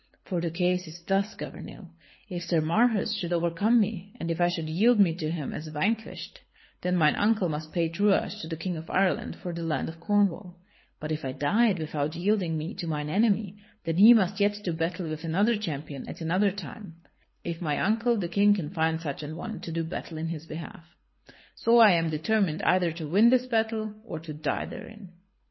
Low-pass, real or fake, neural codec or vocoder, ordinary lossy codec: 7.2 kHz; fake; codec, 24 kHz, 6 kbps, HILCodec; MP3, 24 kbps